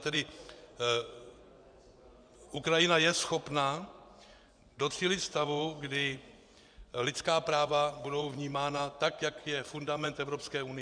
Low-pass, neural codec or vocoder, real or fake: 9.9 kHz; vocoder, 24 kHz, 100 mel bands, Vocos; fake